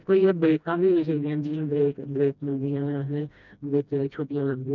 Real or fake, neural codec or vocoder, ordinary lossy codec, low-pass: fake; codec, 16 kHz, 1 kbps, FreqCodec, smaller model; none; 7.2 kHz